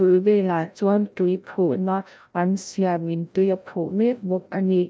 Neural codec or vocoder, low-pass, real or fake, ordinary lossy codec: codec, 16 kHz, 0.5 kbps, FreqCodec, larger model; none; fake; none